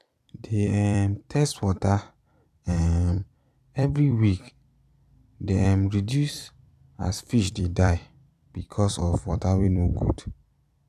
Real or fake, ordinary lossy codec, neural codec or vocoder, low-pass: fake; none; vocoder, 44.1 kHz, 128 mel bands every 256 samples, BigVGAN v2; 14.4 kHz